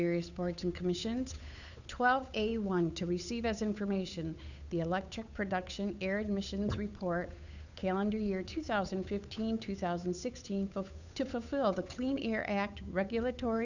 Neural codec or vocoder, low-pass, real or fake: codec, 16 kHz, 8 kbps, FunCodec, trained on Chinese and English, 25 frames a second; 7.2 kHz; fake